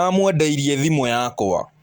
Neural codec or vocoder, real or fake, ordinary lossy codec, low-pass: none; real; Opus, 24 kbps; 19.8 kHz